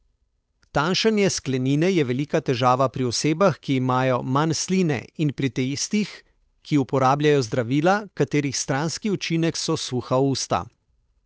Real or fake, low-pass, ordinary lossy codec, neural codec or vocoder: fake; none; none; codec, 16 kHz, 8 kbps, FunCodec, trained on Chinese and English, 25 frames a second